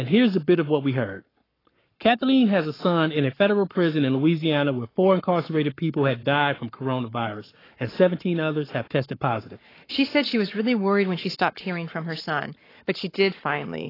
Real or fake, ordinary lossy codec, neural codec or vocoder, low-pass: fake; AAC, 24 kbps; codec, 44.1 kHz, 7.8 kbps, Pupu-Codec; 5.4 kHz